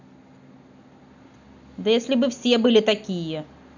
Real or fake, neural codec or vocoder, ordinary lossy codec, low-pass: real; none; none; 7.2 kHz